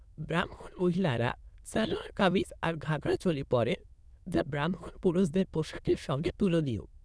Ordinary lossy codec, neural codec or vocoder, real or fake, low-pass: none; autoencoder, 22.05 kHz, a latent of 192 numbers a frame, VITS, trained on many speakers; fake; none